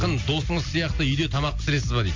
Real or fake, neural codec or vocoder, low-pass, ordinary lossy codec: real; none; 7.2 kHz; MP3, 48 kbps